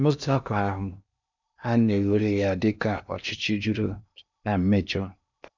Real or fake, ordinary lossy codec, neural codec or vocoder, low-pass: fake; none; codec, 16 kHz in and 24 kHz out, 0.8 kbps, FocalCodec, streaming, 65536 codes; 7.2 kHz